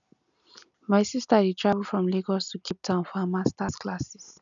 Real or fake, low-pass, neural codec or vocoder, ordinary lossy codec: real; 7.2 kHz; none; none